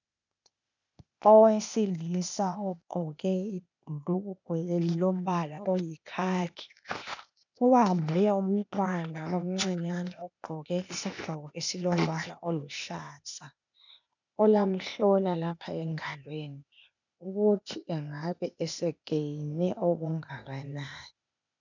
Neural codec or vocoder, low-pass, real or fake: codec, 16 kHz, 0.8 kbps, ZipCodec; 7.2 kHz; fake